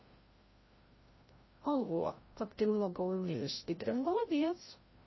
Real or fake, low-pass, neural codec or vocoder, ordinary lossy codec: fake; 7.2 kHz; codec, 16 kHz, 0.5 kbps, FreqCodec, larger model; MP3, 24 kbps